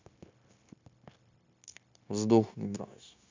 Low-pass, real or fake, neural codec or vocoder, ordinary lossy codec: 7.2 kHz; fake; codec, 16 kHz, 0.9 kbps, LongCat-Audio-Codec; MP3, 64 kbps